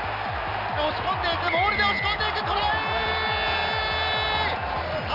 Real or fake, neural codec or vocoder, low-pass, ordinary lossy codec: real; none; 5.4 kHz; none